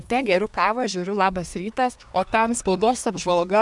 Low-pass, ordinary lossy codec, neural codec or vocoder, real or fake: 10.8 kHz; MP3, 96 kbps; codec, 24 kHz, 1 kbps, SNAC; fake